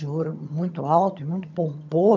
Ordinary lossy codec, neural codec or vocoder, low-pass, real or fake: none; vocoder, 22.05 kHz, 80 mel bands, HiFi-GAN; 7.2 kHz; fake